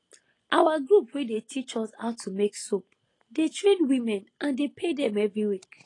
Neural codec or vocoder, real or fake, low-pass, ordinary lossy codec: none; real; 10.8 kHz; AAC, 32 kbps